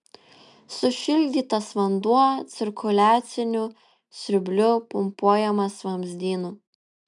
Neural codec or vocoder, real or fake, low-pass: none; real; 10.8 kHz